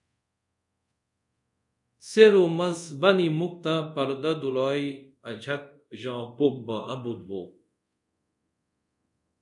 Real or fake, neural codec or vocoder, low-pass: fake; codec, 24 kHz, 0.5 kbps, DualCodec; 10.8 kHz